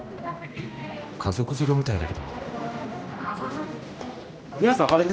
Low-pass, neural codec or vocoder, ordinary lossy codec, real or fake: none; codec, 16 kHz, 1 kbps, X-Codec, HuBERT features, trained on general audio; none; fake